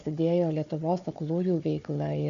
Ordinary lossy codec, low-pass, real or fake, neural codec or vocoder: AAC, 96 kbps; 7.2 kHz; fake; codec, 16 kHz, 4 kbps, FunCodec, trained on Chinese and English, 50 frames a second